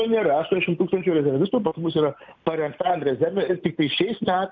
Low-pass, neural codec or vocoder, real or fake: 7.2 kHz; none; real